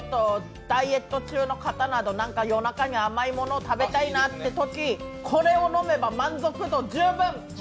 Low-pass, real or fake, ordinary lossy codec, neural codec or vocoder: none; real; none; none